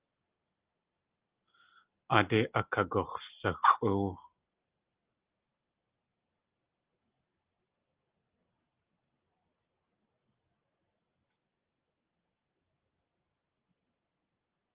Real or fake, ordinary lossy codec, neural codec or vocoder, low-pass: real; Opus, 24 kbps; none; 3.6 kHz